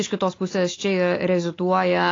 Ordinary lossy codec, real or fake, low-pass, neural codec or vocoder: AAC, 32 kbps; real; 7.2 kHz; none